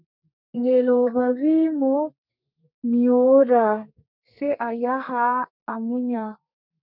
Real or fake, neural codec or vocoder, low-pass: fake; codec, 32 kHz, 1.9 kbps, SNAC; 5.4 kHz